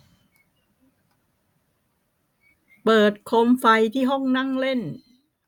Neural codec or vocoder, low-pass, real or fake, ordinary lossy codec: none; 19.8 kHz; real; none